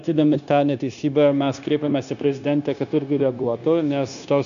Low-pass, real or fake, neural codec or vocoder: 7.2 kHz; fake; codec, 16 kHz, 0.9 kbps, LongCat-Audio-Codec